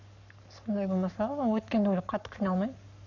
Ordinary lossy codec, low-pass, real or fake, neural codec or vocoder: none; 7.2 kHz; fake; codec, 44.1 kHz, 7.8 kbps, Pupu-Codec